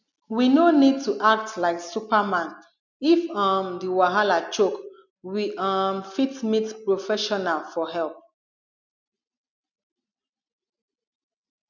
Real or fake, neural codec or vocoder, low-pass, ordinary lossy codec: real; none; 7.2 kHz; none